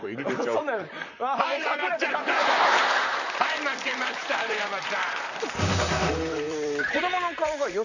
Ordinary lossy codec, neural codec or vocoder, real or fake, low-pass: none; vocoder, 44.1 kHz, 128 mel bands, Pupu-Vocoder; fake; 7.2 kHz